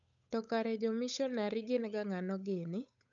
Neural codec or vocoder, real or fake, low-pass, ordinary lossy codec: codec, 16 kHz, 8 kbps, FunCodec, trained on Chinese and English, 25 frames a second; fake; 7.2 kHz; none